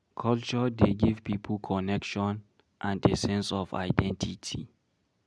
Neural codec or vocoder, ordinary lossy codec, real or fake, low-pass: none; none; real; none